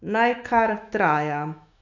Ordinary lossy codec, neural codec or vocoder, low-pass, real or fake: none; autoencoder, 48 kHz, 128 numbers a frame, DAC-VAE, trained on Japanese speech; 7.2 kHz; fake